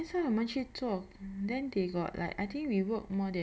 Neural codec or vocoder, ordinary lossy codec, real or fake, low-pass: none; none; real; none